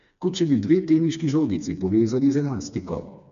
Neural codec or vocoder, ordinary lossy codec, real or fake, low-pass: codec, 16 kHz, 2 kbps, FreqCodec, smaller model; none; fake; 7.2 kHz